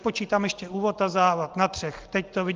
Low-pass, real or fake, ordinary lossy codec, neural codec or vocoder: 7.2 kHz; real; Opus, 24 kbps; none